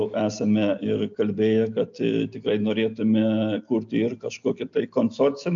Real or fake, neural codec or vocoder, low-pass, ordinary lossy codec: real; none; 7.2 kHz; AAC, 64 kbps